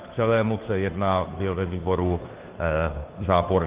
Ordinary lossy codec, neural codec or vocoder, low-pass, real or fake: Opus, 32 kbps; codec, 16 kHz, 2 kbps, FunCodec, trained on Chinese and English, 25 frames a second; 3.6 kHz; fake